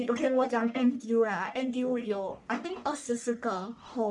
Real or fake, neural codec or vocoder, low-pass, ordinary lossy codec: fake; codec, 44.1 kHz, 1.7 kbps, Pupu-Codec; 10.8 kHz; none